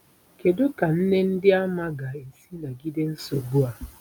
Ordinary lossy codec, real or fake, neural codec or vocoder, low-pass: none; real; none; none